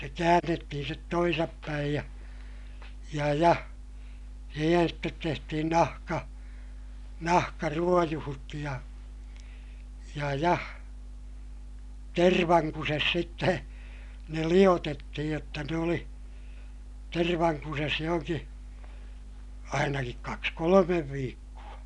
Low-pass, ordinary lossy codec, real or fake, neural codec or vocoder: 10.8 kHz; none; real; none